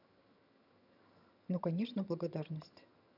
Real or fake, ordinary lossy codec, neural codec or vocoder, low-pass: fake; none; vocoder, 22.05 kHz, 80 mel bands, HiFi-GAN; 5.4 kHz